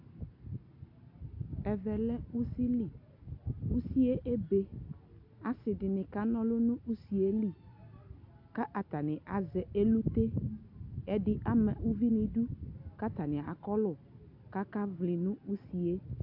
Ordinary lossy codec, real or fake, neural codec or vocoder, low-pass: AAC, 48 kbps; real; none; 5.4 kHz